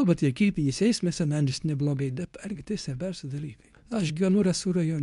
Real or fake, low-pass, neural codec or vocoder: fake; 10.8 kHz; codec, 24 kHz, 0.9 kbps, WavTokenizer, medium speech release version 2